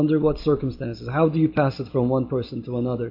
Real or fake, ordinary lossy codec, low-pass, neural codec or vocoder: real; MP3, 32 kbps; 5.4 kHz; none